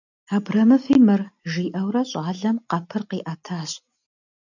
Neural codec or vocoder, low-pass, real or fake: none; 7.2 kHz; real